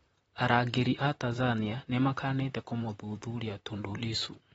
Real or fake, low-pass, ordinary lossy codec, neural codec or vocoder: fake; 19.8 kHz; AAC, 24 kbps; vocoder, 44.1 kHz, 128 mel bands, Pupu-Vocoder